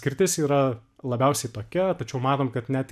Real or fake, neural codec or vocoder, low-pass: real; none; 14.4 kHz